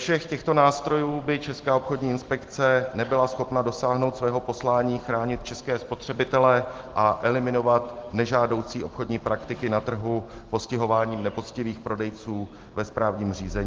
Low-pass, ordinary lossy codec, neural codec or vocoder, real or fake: 7.2 kHz; Opus, 16 kbps; none; real